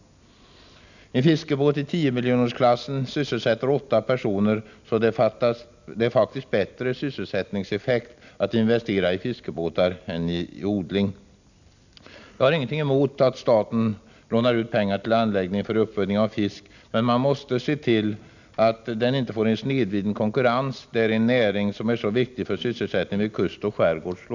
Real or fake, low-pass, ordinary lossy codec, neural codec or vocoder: real; 7.2 kHz; none; none